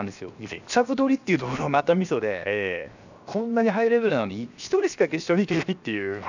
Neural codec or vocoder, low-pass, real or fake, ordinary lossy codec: codec, 16 kHz, 0.7 kbps, FocalCodec; 7.2 kHz; fake; none